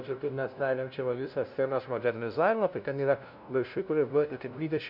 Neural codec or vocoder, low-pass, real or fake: codec, 16 kHz, 0.5 kbps, FunCodec, trained on LibriTTS, 25 frames a second; 5.4 kHz; fake